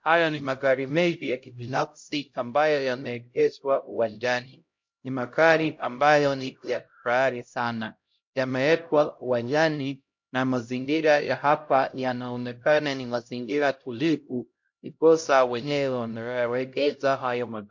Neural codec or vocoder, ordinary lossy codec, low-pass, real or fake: codec, 16 kHz, 0.5 kbps, X-Codec, HuBERT features, trained on LibriSpeech; MP3, 48 kbps; 7.2 kHz; fake